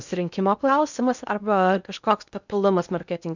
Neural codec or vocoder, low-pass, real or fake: codec, 16 kHz in and 24 kHz out, 0.6 kbps, FocalCodec, streaming, 2048 codes; 7.2 kHz; fake